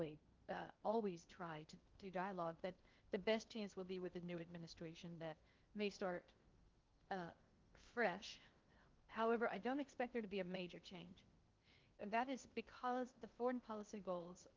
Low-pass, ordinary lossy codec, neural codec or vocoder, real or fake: 7.2 kHz; Opus, 24 kbps; codec, 16 kHz in and 24 kHz out, 0.6 kbps, FocalCodec, streaming, 2048 codes; fake